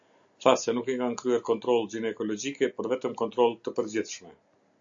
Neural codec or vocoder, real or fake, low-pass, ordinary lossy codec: none; real; 7.2 kHz; AAC, 64 kbps